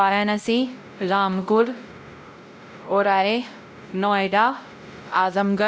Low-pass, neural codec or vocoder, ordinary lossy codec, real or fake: none; codec, 16 kHz, 0.5 kbps, X-Codec, WavLM features, trained on Multilingual LibriSpeech; none; fake